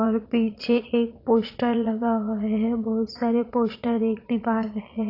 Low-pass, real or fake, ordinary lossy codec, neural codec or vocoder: 5.4 kHz; fake; AAC, 32 kbps; vocoder, 22.05 kHz, 80 mel bands, Vocos